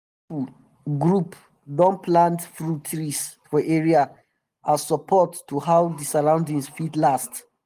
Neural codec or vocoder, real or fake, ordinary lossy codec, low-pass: none; real; Opus, 24 kbps; 14.4 kHz